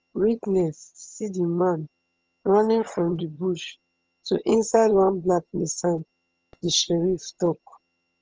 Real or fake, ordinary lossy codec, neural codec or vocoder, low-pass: fake; Opus, 24 kbps; vocoder, 22.05 kHz, 80 mel bands, HiFi-GAN; 7.2 kHz